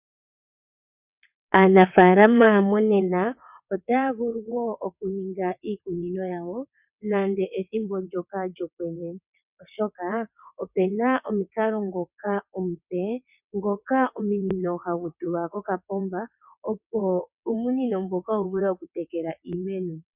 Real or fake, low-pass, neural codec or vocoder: fake; 3.6 kHz; vocoder, 22.05 kHz, 80 mel bands, WaveNeXt